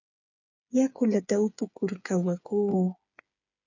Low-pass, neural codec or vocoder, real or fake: 7.2 kHz; codec, 16 kHz, 8 kbps, FreqCodec, smaller model; fake